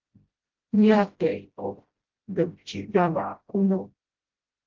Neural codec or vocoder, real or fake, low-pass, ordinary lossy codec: codec, 16 kHz, 0.5 kbps, FreqCodec, smaller model; fake; 7.2 kHz; Opus, 16 kbps